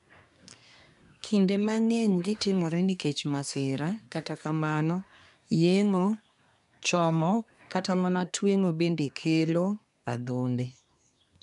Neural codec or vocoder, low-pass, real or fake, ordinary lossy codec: codec, 24 kHz, 1 kbps, SNAC; 10.8 kHz; fake; none